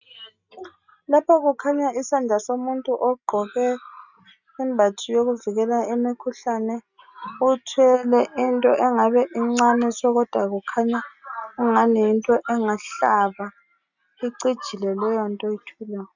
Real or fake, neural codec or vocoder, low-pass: real; none; 7.2 kHz